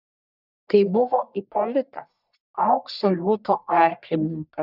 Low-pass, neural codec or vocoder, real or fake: 5.4 kHz; codec, 44.1 kHz, 1.7 kbps, Pupu-Codec; fake